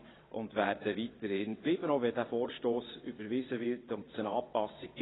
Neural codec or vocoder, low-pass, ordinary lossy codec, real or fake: vocoder, 22.05 kHz, 80 mel bands, WaveNeXt; 7.2 kHz; AAC, 16 kbps; fake